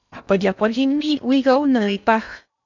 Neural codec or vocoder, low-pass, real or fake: codec, 16 kHz in and 24 kHz out, 0.6 kbps, FocalCodec, streaming, 2048 codes; 7.2 kHz; fake